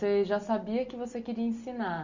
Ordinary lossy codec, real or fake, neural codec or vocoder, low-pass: none; real; none; 7.2 kHz